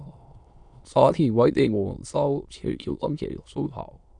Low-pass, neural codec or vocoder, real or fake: 9.9 kHz; autoencoder, 22.05 kHz, a latent of 192 numbers a frame, VITS, trained on many speakers; fake